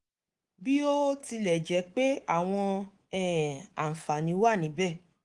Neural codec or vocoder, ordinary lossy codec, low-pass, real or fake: codec, 24 kHz, 3.1 kbps, DualCodec; Opus, 24 kbps; 10.8 kHz; fake